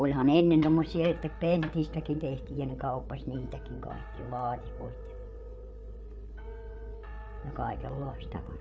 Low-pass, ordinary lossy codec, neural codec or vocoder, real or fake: none; none; codec, 16 kHz, 8 kbps, FreqCodec, larger model; fake